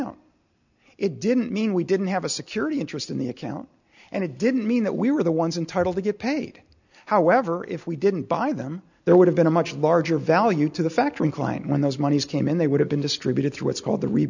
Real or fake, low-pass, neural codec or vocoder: real; 7.2 kHz; none